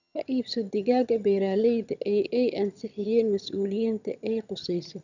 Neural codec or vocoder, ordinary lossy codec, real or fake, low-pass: vocoder, 22.05 kHz, 80 mel bands, HiFi-GAN; none; fake; 7.2 kHz